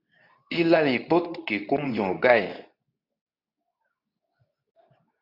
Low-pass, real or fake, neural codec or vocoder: 5.4 kHz; fake; codec, 24 kHz, 0.9 kbps, WavTokenizer, medium speech release version 2